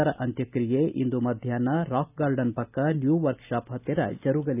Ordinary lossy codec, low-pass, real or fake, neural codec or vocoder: none; 3.6 kHz; real; none